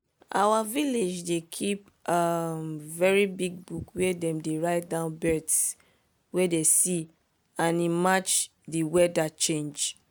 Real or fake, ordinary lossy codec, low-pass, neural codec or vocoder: real; none; none; none